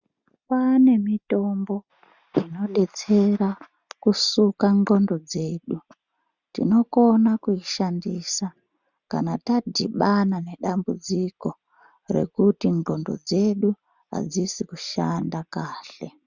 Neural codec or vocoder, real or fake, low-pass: none; real; 7.2 kHz